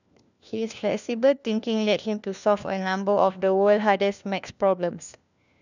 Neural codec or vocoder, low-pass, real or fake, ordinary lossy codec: codec, 16 kHz, 1 kbps, FunCodec, trained on LibriTTS, 50 frames a second; 7.2 kHz; fake; none